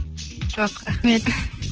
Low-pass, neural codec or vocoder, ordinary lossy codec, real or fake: 7.2 kHz; vocoder, 44.1 kHz, 128 mel bands, Pupu-Vocoder; Opus, 16 kbps; fake